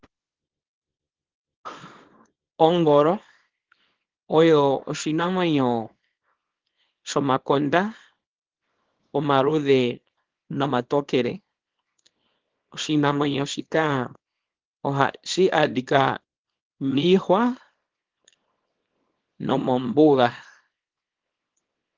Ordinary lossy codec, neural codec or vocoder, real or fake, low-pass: Opus, 16 kbps; codec, 24 kHz, 0.9 kbps, WavTokenizer, small release; fake; 7.2 kHz